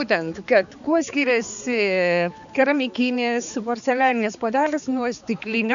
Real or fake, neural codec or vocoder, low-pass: fake; codec, 16 kHz, 4 kbps, X-Codec, HuBERT features, trained on balanced general audio; 7.2 kHz